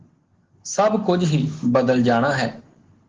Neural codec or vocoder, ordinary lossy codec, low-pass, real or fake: none; Opus, 16 kbps; 7.2 kHz; real